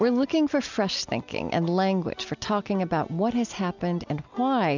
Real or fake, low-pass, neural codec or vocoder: real; 7.2 kHz; none